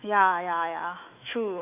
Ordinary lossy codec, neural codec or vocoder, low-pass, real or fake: none; autoencoder, 48 kHz, 128 numbers a frame, DAC-VAE, trained on Japanese speech; 3.6 kHz; fake